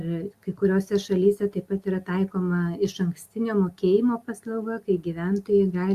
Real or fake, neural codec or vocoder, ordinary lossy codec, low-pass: real; none; Opus, 64 kbps; 14.4 kHz